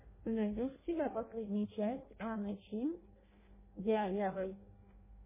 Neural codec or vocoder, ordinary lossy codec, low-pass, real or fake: codec, 16 kHz in and 24 kHz out, 0.6 kbps, FireRedTTS-2 codec; MP3, 16 kbps; 3.6 kHz; fake